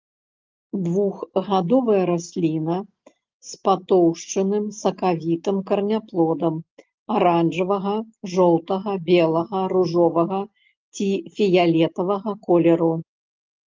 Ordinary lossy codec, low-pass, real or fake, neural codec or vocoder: Opus, 32 kbps; 7.2 kHz; real; none